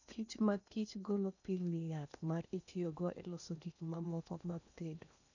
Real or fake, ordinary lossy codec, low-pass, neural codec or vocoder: fake; none; 7.2 kHz; codec, 16 kHz in and 24 kHz out, 0.8 kbps, FocalCodec, streaming, 65536 codes